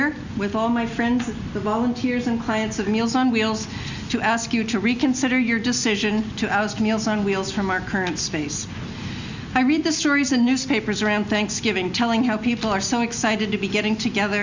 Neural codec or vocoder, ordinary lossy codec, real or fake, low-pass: none; Opus, 64 kbps; real; 7.2 kHz